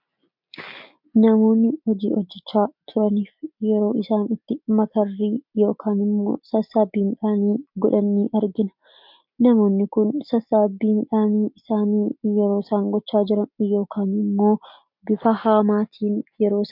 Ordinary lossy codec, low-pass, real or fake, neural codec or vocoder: MP3, 48 kbps; 5.4 kHz; real; none